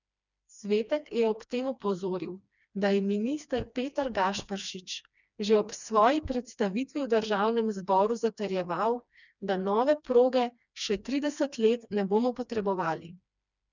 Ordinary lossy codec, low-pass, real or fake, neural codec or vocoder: none; 7.2 kHz; fake; codec, 16 kHz, 2 kbps, FreqCodec, smaller model